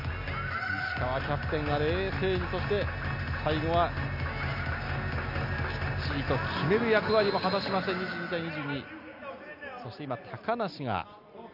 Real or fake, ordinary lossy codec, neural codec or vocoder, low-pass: real; none; none; 5.4 kHz